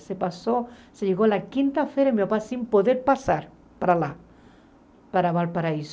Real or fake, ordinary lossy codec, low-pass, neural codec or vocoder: real; none; none; none